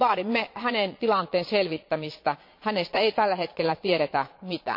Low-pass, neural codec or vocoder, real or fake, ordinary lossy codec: 5.4 kHz; vocoder, 22.05 kHz, 80 mel bands, Vocos; fake; MP3, 32 kbps